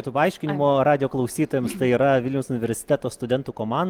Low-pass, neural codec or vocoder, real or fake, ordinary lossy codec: 19.8 kHz; none; real; Opus, 24 kbps